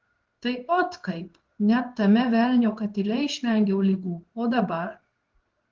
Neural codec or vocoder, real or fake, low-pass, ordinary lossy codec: codec, 16 kHz in and 24 kHz out, 1 kbps, XY-Tokenizer; fake; 7.2 kHz; Opus, 16 kbps